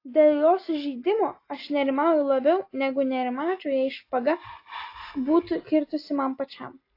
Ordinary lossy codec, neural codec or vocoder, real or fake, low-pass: AAC, 32 kbps; none; real; 5.4 kHz